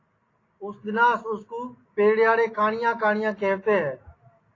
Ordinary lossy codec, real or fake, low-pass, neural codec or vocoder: AAC, 32 kbps; real; 7.2 kHz; none